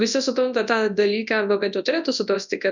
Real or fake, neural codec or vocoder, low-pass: fake; codec, 24 kHz, 0.9 kbps, WavTokenizer, large speech release; 7.2 kHz